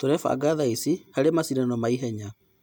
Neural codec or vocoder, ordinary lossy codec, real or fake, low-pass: none; none; real; none